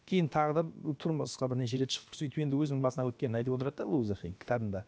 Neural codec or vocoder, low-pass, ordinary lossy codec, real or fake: codec, 16 kHz, about 1 kbps, DyCAST, with the encoder's durations; none; none; fake